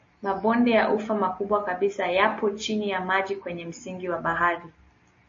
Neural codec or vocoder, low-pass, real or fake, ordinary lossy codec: none; 7.2 kHz; real; MP3, 32 kbps